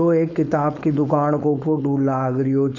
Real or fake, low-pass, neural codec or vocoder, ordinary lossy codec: fake; 7.2 kHz; codec, 16 kHz, 4.8 kbps, FACodec; none